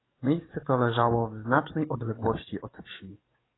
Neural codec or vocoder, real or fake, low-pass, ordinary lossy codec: codec, 44.1 kHz, 7.8 kbps, DAC; fake; 7.2 kHz; AAC, 16 kbps